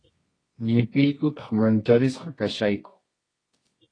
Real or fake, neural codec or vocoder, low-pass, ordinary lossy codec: fake; codec, 24 kHz, 0.9 kbps, WavTokenizer, medium music audio release; 9.9 kHz; AAC, 32 kbps